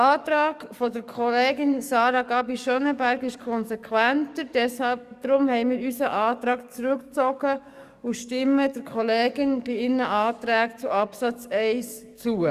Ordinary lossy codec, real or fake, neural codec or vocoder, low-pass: Opus, 64 kbps; fake; codec, 44.1 kHz, 7.8 kbps, DAC; 14.4 kHz